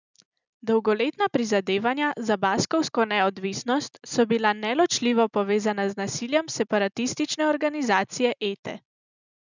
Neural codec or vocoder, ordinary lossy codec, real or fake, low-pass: none; none; real; 7.2 kHz